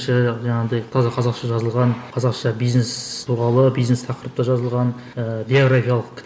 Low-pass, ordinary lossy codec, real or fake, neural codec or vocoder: none; none; real; none